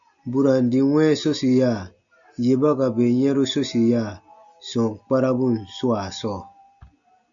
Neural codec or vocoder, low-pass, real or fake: none; 7.2 kHz; real